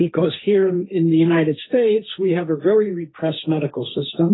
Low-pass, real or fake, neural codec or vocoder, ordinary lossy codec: 7.2 kHz; fake; codec, 16 kHz, 1.1 kbps, Voila-Tokenizer; AAC, 16 kbps